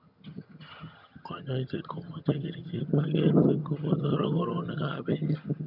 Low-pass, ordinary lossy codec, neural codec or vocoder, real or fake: 5.4 kHz; none; vocoder, 22.05 kHz, 80 mel bands, HiFi-GAN; fake